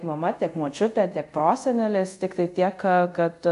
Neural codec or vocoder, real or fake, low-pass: codec, 24 kHz, 0.5 kbps, DualCodec; fake; 10.8 kHz